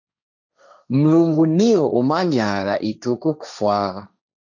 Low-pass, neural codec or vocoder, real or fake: 7.2 kHz; codec, 16 kHz, 1.1 kbps, Voila-Tokenizer; fake